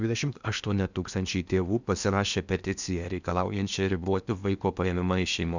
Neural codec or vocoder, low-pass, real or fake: codec, 16 kHz in and 24 kHz out, 0.8 kbps, FocalCodec, streaming, 65536 codes; 7.2 kHz; fake